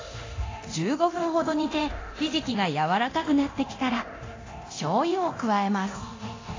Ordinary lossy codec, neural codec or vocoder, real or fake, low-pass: AAC, 32 kbps; codec, 24 kHz, 0.9 kbps, DualCodec; fake; 7.2 kHz